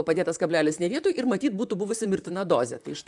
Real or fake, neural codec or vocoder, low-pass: fake; vocoder, 44.1 kHz, 128 mel bands, Pupu-Vocoder; 10.8 kHz